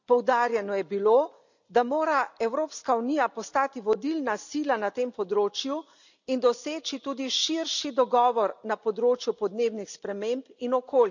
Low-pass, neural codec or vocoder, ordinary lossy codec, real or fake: 7.2 kHz; none; none; real